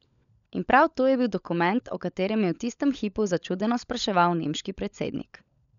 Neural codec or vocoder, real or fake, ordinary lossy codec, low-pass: codec, 16 kHz, 16 kbps, FunCodec, trained on LibriTTS, 50 frames a second; fake; none; 7.2 kHz